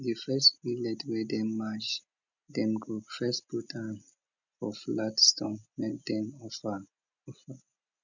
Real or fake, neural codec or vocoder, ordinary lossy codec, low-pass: real; none; none; 7.2 kHz